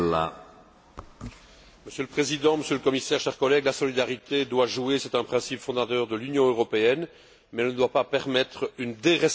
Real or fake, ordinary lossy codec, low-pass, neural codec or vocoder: real; none; none; none